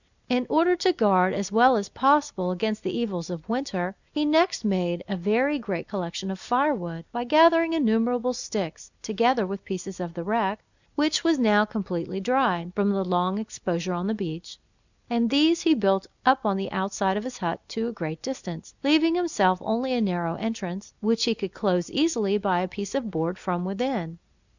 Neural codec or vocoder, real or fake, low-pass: none; real; 7.2 kHz